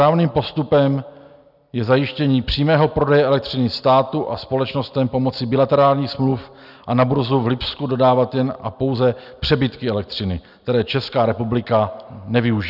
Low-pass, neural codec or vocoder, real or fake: 5.4 kHz; none; real